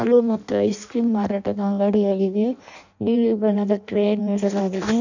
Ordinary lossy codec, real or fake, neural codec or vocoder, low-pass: none; fake; codec, 16 kHz in and 24 kHz out, 0.6 kbps, FireRedTTS-2 codec; 7.2 kHz